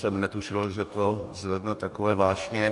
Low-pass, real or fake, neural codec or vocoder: 10.8 kHz; fake; codec, 44.1 kHz, 2.6 kbps, DAC